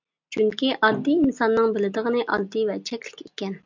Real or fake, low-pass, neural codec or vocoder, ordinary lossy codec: real; 7.2 kHz; none; MP3, 64 kbps